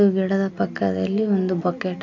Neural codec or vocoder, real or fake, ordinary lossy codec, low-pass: none; real; AAC, 48 kbps; 7.2 kHz